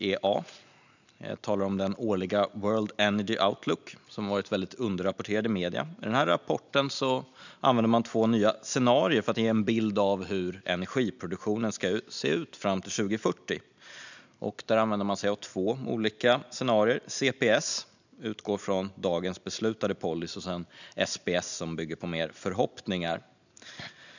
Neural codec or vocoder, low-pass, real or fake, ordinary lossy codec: none; 7.2 kHz; real; none